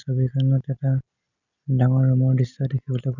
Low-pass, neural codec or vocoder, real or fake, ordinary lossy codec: 7.2 kHz; none; real; none